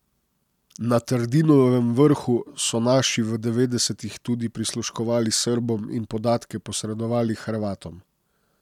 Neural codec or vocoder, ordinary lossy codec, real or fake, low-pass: none; none; real; 19.8 kHz